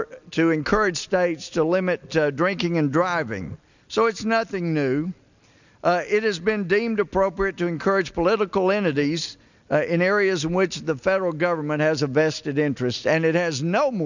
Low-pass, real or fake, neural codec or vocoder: 7.2 kHz; real; none